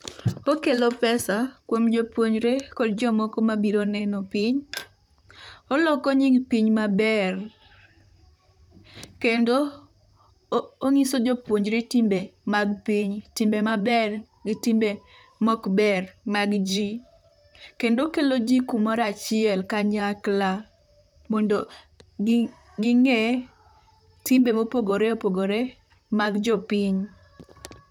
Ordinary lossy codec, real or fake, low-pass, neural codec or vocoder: none; fake; 19.8 kHz; vocoder, 44.1 kHz, 128 mel bands, Pupu-Vocoder